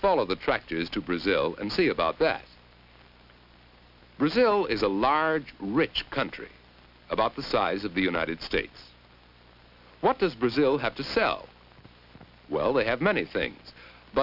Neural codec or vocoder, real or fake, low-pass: none; real; 5.4 kHz